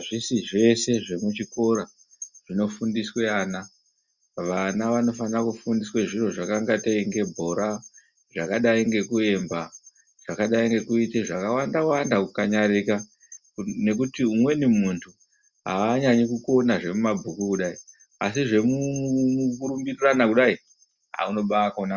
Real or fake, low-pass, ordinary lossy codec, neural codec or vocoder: real; 7.2 kHz; Opus, 64 kbps; none